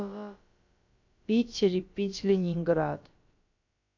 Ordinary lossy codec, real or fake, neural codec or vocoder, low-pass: MP3, 64 kbps; fake; codec, 16 kHz, about 1 kbps, DyCAST, with the encoder's durations; 7.2 kHz